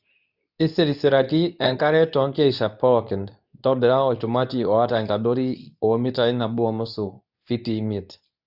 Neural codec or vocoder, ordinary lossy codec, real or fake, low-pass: codec, 24 kHz, 0.9 kbps, WavTokenizer, medium speech release version 2; none; fake; 5.4 kHz